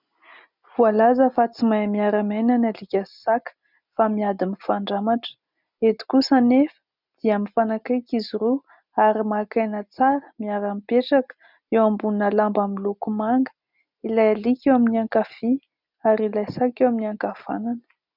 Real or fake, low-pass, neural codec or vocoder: real; 5.4 kHz; none